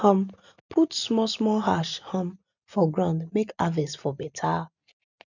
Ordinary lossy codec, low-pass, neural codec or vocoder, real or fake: none; 7.2 kHz; none; real